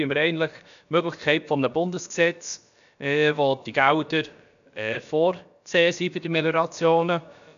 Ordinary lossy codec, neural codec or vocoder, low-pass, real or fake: none; codec, 16 kHz, about 1 kbps, DyCAST, with the encoder's durations; 7.2 kHz; fake